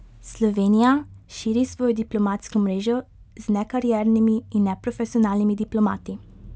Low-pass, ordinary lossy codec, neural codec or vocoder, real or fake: none; none; none; real